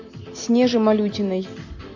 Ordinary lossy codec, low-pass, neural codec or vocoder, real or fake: MP3, 48 kbps; 7.2 kHz; none; real